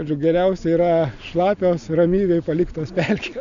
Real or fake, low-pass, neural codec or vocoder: real; 7.2 kHz; none